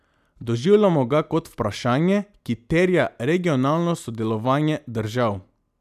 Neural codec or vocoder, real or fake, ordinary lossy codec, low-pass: none; real; none; 14.4 kHz